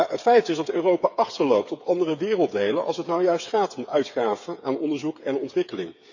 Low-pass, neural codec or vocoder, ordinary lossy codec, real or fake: 7.2 kHz; codec, 16 kHz, 8 kbps, FreqCodec, smaller model; none; fake